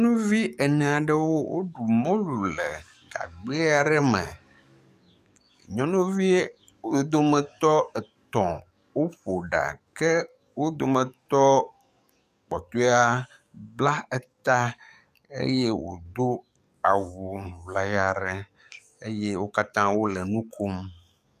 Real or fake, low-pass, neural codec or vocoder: fake; 14.4 kHz; codec, 44.1 kHz, 7.8 kbps, DAC